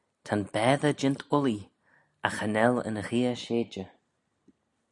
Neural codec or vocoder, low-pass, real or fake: none; 10.8 kHz; real